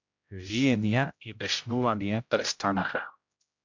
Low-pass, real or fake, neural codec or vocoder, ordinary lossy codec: 7.2 kHz; fake; codec, 16 kHz, 0.5 kbps, X-Codec, HuBERT features, trained on general audio; MP3, 64 kbps